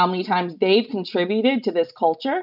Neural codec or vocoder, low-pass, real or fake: none; 5.4 kHz; real